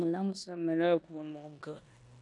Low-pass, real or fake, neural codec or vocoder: 10.8 kHz; fake; codec, 16 kHz in and 24 kHz out, 0.9 kbps, LongCat-Audio-Codec, four codebook decoder